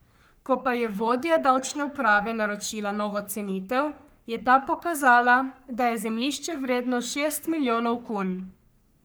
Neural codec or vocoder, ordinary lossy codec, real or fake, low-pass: codec, 44.1 kHz, 3.4 kbps, Pupu-Codec; none; fake; none